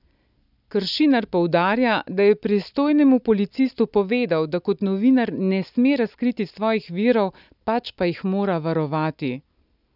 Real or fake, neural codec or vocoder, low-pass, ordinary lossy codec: real; none; 5.4 kHz; none